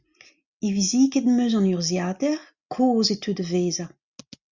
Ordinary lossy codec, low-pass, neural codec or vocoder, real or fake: Opus, 64 kbps; 7.2 kHz; none; real